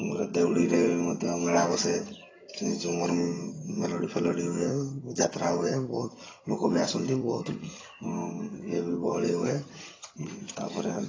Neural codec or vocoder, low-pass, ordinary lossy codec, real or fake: vocoder, 22.05 kHz, 80 mel bands, HiFi-GAN; 7.2 kHz; AAC, 32 kbps; fake